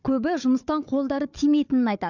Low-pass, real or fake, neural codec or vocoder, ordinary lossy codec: 7.2 kHz; fake; codec, 16 kHz, 4 kbps, FunCodec, trained on Chinese and English, 50 frames a second; none